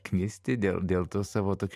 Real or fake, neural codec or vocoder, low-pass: fake; vocoder, 44.1 kHz, 128 mel bands every 512 samples, BigVGAN v2; 14.4 kHz